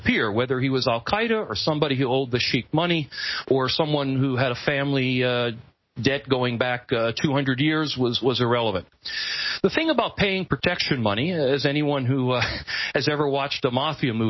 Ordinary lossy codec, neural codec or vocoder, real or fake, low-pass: MP3, 24 kbps; none; real; 7.2 kHz